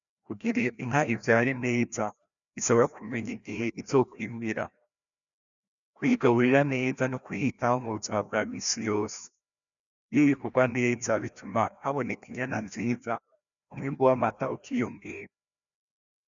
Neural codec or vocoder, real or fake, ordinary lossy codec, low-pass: codec, 16 kHz, 1 kbps, FreqCodec, larger model; fake; MP3, 96 kbps; 7.2 kHz